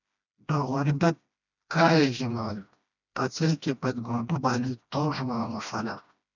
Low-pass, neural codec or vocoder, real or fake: 7.2 kHz; codec, 16 kHz, 1 kbps, FreqCodec, smaller model; fake